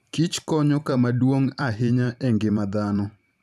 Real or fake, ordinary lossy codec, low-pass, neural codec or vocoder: fake; none; 14.4 kHz; vocoder, 44.1 kHz, 128 mel bands every 512 samples, BigVGAN v2